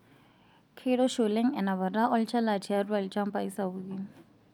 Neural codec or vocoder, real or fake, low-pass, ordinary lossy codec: none; real; 19.8 kHz; none